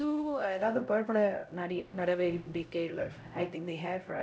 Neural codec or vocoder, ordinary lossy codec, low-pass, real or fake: codec, 16 kHz, 0.5 kbps, X-Codec, HuBERT features, trained on LibriSpeech; none; none; fake